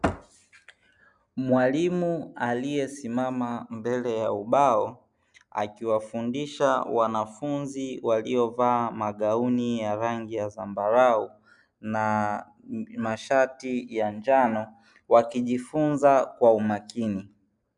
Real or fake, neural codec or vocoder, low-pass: real; none; 10.8 kHz